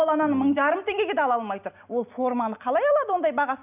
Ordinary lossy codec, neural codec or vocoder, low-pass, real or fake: none; none; 3.6 kHz; real